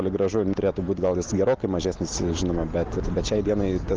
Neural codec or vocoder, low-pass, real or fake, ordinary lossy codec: none; 7.2 kHz; real; Opus, 16 kbps